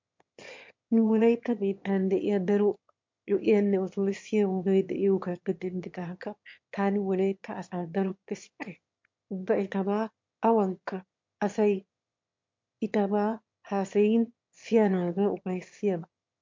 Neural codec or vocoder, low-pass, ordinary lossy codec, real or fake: autoencoder, 22.05 kHz, a latent of 192 numbers a frame, VITS, trained on one speaker; 7.2 kHz; MP3, 48 kbps; fake